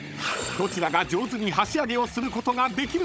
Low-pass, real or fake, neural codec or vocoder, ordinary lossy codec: none; fake; codec, 16 kHz, 16 kbps, FunCodec, trained on Chinese and English, 50 frames a second; none